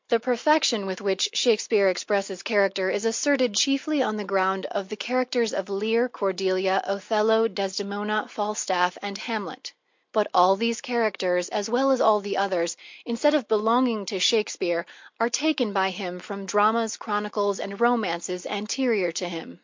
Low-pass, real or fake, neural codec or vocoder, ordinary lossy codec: 7.2 kHz; real; none; MP3, 48 kbps